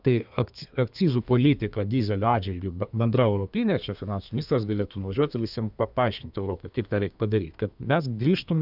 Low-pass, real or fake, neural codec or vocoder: 5.4 kHz; fake; codec, 32 kHz, 1.9 kbps, SNAC